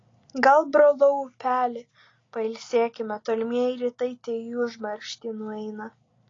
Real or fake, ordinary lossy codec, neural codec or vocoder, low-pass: real; AAC, 32 kbps; none; 7.2 kHz